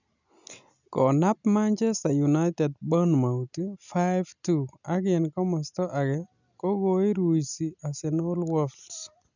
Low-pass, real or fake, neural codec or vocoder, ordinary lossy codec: 7.2 kHz; real; none; none